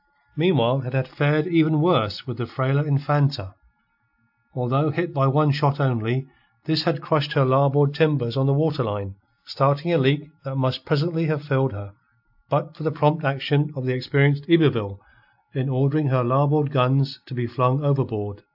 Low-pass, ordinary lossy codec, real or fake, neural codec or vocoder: 5.4 kHz; AAC, 48 kbps; real; none